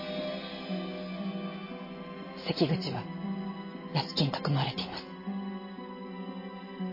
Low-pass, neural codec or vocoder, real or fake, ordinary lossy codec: 5.4 kHz; none; real; MP3, 24 kbps